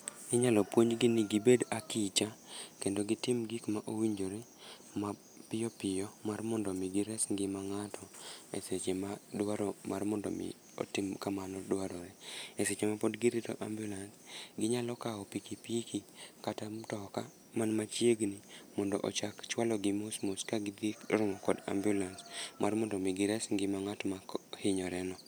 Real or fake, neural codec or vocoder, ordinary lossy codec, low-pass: real; none; none; none